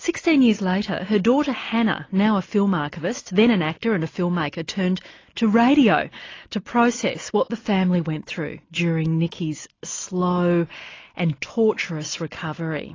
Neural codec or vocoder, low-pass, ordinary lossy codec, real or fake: none; 7.2 kHz; AAC, 32 kbps; real